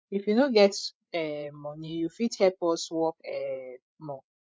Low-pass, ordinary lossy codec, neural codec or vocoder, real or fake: none; none; codec, 16 kHz, 8 kbps, FreqCodec, larger model; fake